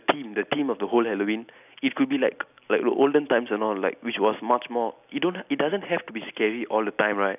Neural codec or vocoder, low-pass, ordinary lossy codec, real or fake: none; 3.6 kHz; none; real